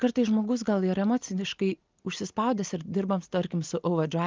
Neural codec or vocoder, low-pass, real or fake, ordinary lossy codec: none; 7.2 kHz; real; Opus, 32 kbps